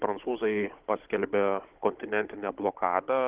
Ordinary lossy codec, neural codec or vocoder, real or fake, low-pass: Opus, 16 kbps; codec, 16 kHz, 16 kbps, FunCodec, trained on Chinese and English, 50 frames a second; fake; 3.6 kHz